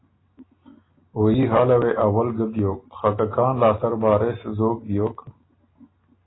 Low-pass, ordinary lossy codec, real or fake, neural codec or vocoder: 7.2 kHz; AAC, 16 kbps; real; none